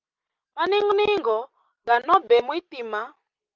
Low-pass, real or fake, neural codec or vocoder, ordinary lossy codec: 7.2 kHz; real; none; Opus, 24 kbps